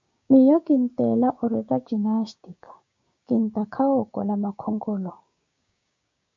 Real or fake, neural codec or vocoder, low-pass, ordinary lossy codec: fake; codec, 16 kHz, 6 kbps, DAC; 7.2 kHz; MP3, 48 kbps